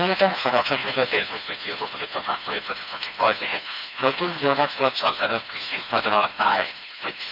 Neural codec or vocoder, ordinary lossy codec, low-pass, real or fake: codec, 16 kHz, 2 kbps, FreqCodec, smaller model; none; 5.4 kHz; fake